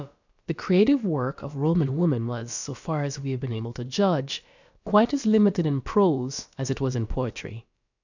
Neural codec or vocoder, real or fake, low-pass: codec, 16 kHz, about 1 kbps, DyCAST, with the encoder's durations; fake; 7.2 kHz